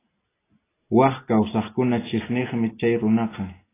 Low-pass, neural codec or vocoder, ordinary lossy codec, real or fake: 3.6 kHz; none; AAC, 16 kbps; real